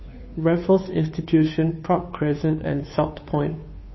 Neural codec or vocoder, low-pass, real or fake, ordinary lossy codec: codec, 16 kHz, 2 kbps, FunCodec, trained on Chinese and English, 25 frames a second; 7.2 kHz; fake; MP3, 24 kbps